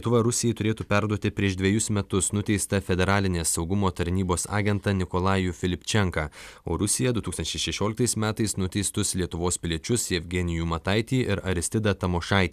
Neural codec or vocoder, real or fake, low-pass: none; real; 14.4 kHz